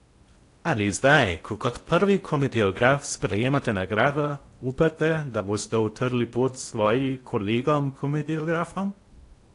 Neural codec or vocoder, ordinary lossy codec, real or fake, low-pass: codec, 16 kHz in and 24 kHz out, 0.8 kbps, FocalCodec, streaming, 65536 codes; AAC, 48 kbps; fake; 10.8 kHz